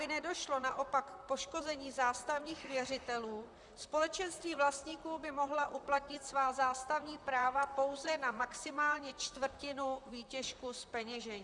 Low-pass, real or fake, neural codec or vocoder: 10.8 kHz; fake; vocoder, 44.1 kHz, 128 mel bands, Pupu-Vocoder